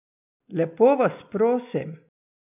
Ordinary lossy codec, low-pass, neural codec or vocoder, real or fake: none; 3.6 kHz; none; real